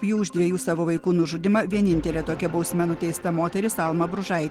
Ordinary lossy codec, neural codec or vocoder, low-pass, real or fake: Opus, 16 kbps; none; 19.8 kHz; real